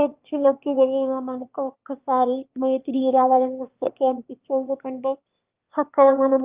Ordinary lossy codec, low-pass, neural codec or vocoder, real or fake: Opus, 32 kbps; 3.6 kHz; autoencoder, 22.05 kHz, a latent of 192 numbers a frame, VITS, trained on one speaker; fake